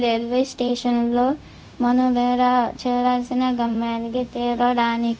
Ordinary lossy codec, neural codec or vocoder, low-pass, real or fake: none; codec, 16 kHz, 0.4 kbps, LongCat-Audio-Codec; none; fake